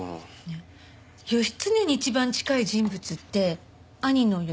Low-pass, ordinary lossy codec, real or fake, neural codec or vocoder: none; none; real; none